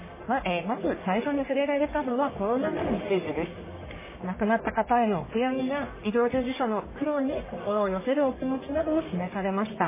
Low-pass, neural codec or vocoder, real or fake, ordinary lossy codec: 3.6 kHz; codec, 44.1 kHz, 1.7 kbps, Pupu-Codec; fake; MP3, 16 kbps